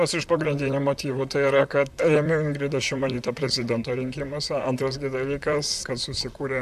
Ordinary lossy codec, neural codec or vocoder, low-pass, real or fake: AAC, 96 kbps; vocoder, 44.1 kHz, 128 mel bands, Pupu-Vocoder; 14.4 kHz; fake